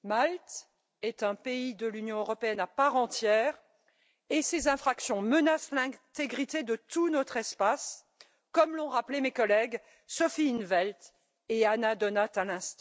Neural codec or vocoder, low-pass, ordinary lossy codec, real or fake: none; none; none; real